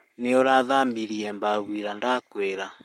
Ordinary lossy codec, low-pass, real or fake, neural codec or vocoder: MP3, 64 kbps; 19.8 kHz; fake; codec, 44.1 kHz, 7.8 kbps, Pupu-Codec